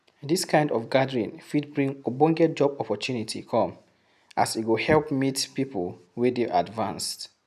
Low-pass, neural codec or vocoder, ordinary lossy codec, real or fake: 14.4 kHz; none; none; real